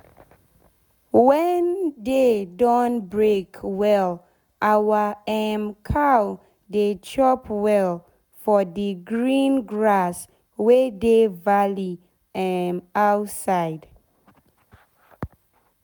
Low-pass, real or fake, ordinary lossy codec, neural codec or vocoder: none; real; none; none